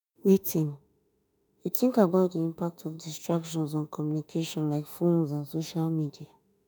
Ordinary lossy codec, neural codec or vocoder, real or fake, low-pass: none; autoencoder, 48 kHz, 32 numbers a frame, DAC-VAE, trained on Japanese speech; fake; none